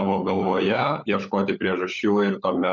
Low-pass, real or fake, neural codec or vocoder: 7.2 kHz; fake; codec, 16 kHz, 16 kbps, FunCodec, trained on LibriTTS, 50 frames a second